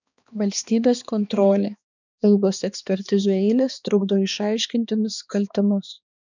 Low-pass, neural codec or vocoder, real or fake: 7.2 kHz; codec, 16 kHz, 2 kbps, X-Codec, HuBERT features, trained on balanced general audio; fake